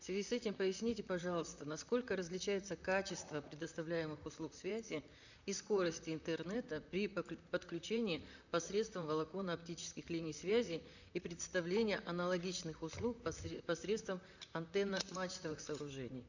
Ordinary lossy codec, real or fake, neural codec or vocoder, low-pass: none; fake; vocoder, 44.1 kHz, 128 mel bands, Pupu-Vocoder; 7.2 kHz